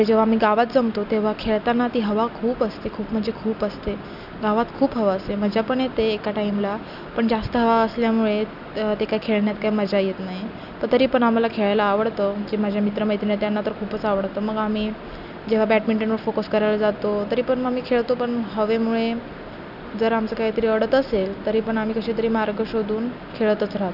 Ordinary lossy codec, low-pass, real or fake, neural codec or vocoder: none; 5.4 kHz; real; none